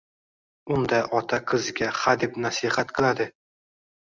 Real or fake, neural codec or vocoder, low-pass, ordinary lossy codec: real; none; 7.2 kHz; Opus, 64 kbps